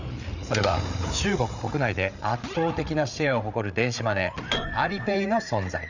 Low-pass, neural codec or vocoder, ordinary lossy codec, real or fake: 7.2 kHz; codec, 16 kHz, 8 kbps, FreqCodec, larger model; none; fake